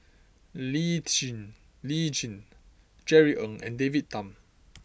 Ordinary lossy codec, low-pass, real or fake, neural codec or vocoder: none; none; real; none